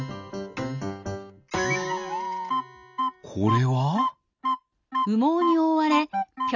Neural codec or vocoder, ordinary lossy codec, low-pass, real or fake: none; none; 7.2 kHz; real